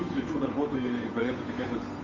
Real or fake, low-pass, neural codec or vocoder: fake; 7.2 kHz; codec, 16 kHz, 8 kbps, FunCodec, trained on Chinese and English, 25 frames a second